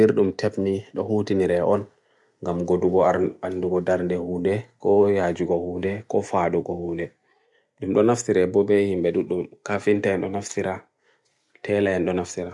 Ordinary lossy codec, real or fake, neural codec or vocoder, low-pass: none; real; none; 10.8 kHz